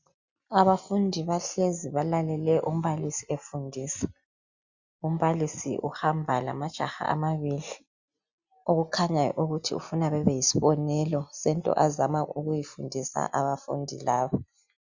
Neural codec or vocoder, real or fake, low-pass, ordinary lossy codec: none; real; 7.2 kHz; Opus, 64 kbps